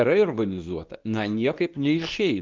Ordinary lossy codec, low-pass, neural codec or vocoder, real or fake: Opus, 24 kbps; 7.2 kHz; codec, 24 kHz, 0.9 kbps, WavTokenizer, medium speech release version 2; fake